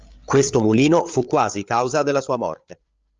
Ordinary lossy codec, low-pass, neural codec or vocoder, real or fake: Opus, 24 kbps; 7.2 kHz; codec, 16 kHz, 8 kbps, FreqCodec, larger model; fake